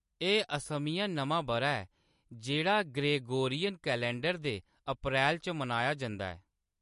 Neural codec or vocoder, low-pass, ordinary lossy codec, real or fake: none; 14.4 kHz; MP3, 48 kbps; real